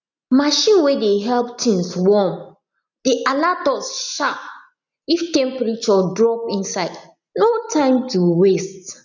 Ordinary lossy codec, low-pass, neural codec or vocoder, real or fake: none; 7.2 kHz; none; real